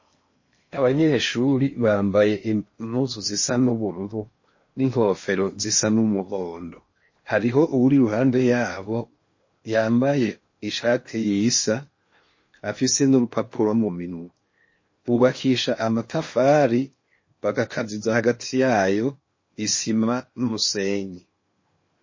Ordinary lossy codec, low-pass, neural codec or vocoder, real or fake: MP3, 32 kbps; 7.2 kHz; codec, 16 kHz in and 24 kHz out, 0.8 kbps, FocalCodec, streaming, 65536 codes; fake